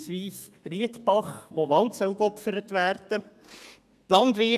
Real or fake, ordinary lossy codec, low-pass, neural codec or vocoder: fake; none; 14.4 kHz; codec, 32 kHz, 1.9 kbps, SNAC